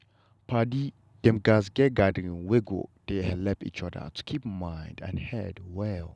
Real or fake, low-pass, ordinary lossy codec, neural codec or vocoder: real; none; none; none